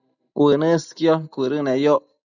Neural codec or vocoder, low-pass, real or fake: none; 7.2 kHz; real